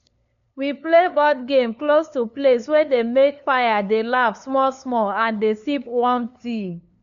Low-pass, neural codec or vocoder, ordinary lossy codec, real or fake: 7.2 kHz; codec, 16 kHz, 2 kbps, FunCodec, trained on LibriTTS, 25 frames a second; none; fake